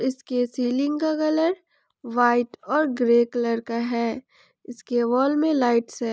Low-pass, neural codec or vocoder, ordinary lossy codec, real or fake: none; none; none; real